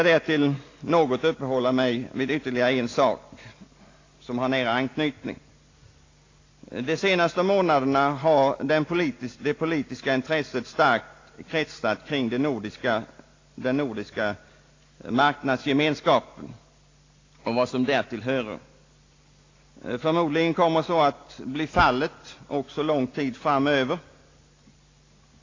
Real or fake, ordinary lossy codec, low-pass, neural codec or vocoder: real; AAC, 32 kbps; 7.2 kHz; none